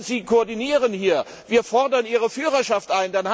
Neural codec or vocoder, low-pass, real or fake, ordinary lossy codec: none; none; real; none